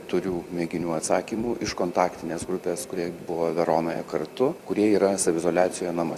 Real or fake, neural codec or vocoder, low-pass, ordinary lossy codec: fake; vocoder, 44.1 kHz, 128 mel bands every 256 samples, BigVGAN v2; 14.4 kHz; Opus, 64 kbps